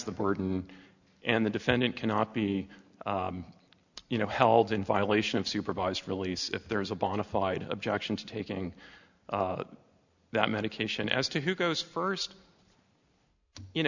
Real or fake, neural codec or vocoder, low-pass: real; none; 7.2 kHz